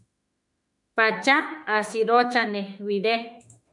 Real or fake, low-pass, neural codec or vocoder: fake; 10.8 kHz; autoencoder, 48 kHz, 32 numbers a frame, DAC-VAE, trained on Japanese speech